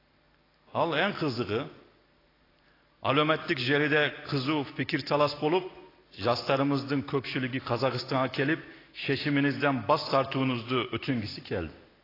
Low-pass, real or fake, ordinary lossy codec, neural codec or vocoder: 5.4 kHz; real; AAC, 24 kbps; none